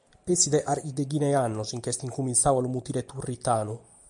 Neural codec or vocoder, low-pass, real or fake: none; 10.8 kHz; real